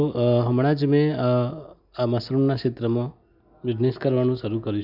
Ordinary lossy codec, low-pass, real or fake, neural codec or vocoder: none; 5.4 kHz; real; none